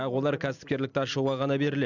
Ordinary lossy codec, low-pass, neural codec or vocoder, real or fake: none; 7.2 kHz; none; real